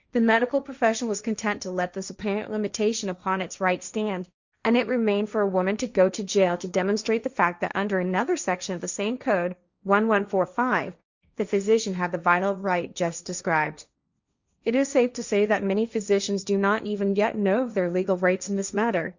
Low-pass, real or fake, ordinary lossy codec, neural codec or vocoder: 7.2 kHz; fake; Opus, 64 kbps; codec, 16 kHz, 1.1 kbps, Voila-Tokenizer